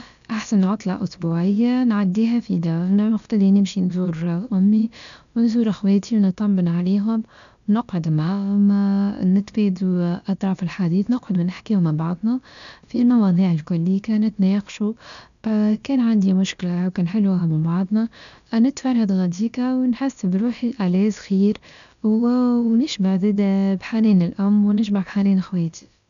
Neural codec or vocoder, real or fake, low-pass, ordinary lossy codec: codec, 16 kHz, about 1 kbps, DyCAST, with the encoder's durations; fake; 7.2 kHz; none